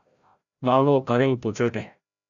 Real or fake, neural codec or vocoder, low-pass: fake; codec, 16 kHz, 0.5 kbps, FreqCodec, larger model; 7.2 kHz